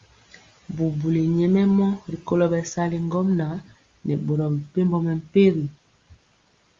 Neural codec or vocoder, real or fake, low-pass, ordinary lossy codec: none; real; 7.2 kHz; Opus, 32 kbps